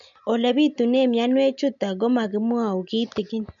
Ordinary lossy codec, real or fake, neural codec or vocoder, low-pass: none; real; none; 7.2 kHz